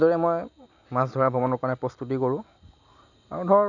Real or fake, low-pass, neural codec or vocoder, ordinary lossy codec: real; 7.2 kHz; none; none